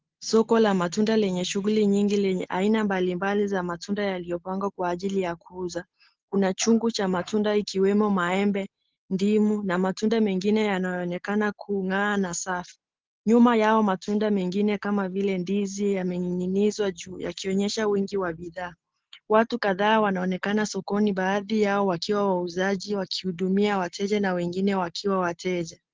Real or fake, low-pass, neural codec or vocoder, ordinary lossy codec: real; 7.2 kHz; none; Opus, 16 kbps